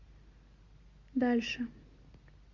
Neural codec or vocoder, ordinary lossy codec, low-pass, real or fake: none; Opus, 64 kbps; 7.2 kHz; real